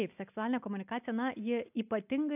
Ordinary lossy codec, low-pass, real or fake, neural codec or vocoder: AAC, 32 kbps; 3.6 kHz; real; none